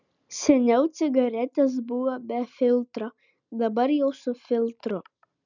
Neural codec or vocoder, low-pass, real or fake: none; 7.2 kHz; real